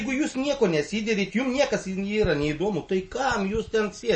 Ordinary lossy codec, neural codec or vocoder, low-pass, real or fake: MP3, 32 kbps; none; 10.8 kHz; real